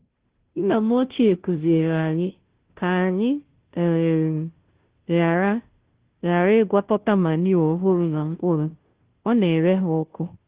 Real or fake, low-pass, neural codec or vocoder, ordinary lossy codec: fake; 3.6 kHz; codec, 16 kHz, 0.5 kbps, FunCodec, trained on Chinese and English, 25 frames a second; Opus, 16 kbps